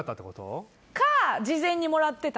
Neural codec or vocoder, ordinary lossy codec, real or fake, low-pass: none; none; real; none